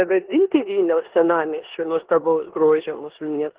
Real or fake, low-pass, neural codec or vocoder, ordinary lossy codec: fake; 3.6 kHz; codec, 16 kHz in and 24 kHz out, 0.9 kbps, LongCat-Audio-Codec, four codebook decoder; Opus, 16 kbps